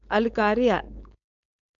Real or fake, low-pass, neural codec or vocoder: fake; 7.2 kHz; codec, 16 kHz, 4.8 kbps, FACodec